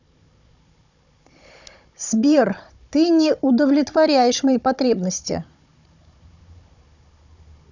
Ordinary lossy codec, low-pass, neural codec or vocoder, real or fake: none; 7.2 kHz; codec, 16 kHz, 16 kbps, FunCodec, trained on Chinese and English, 50 frames a second; fake